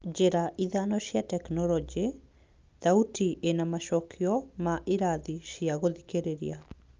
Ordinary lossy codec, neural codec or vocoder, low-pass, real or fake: Opus, 24 kbps; none; 7.2 kHz; real